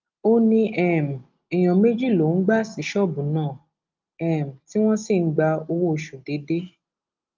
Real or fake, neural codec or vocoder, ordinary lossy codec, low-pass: real; none; Opus, 24 kbps; 7.2 kHz